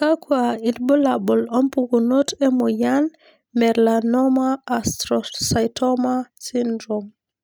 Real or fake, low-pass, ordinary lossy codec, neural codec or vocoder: real; none; none; none